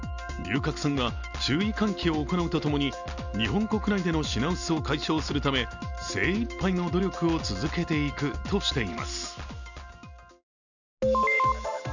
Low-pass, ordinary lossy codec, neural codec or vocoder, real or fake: 7.2 kHz; none; none; real